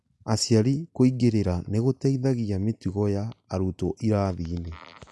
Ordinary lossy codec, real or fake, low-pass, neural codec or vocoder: none; real; none; none